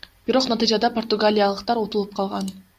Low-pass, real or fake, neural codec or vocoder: 14.4 kHz; real; none